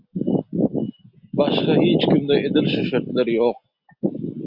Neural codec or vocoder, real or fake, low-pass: none; real; 5.4 kHz